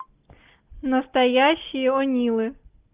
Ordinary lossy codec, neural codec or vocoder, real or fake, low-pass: Opus, 32 kbps; none; real; 3.6 kHz